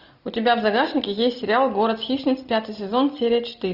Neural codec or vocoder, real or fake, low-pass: none; real; 5.4 kHz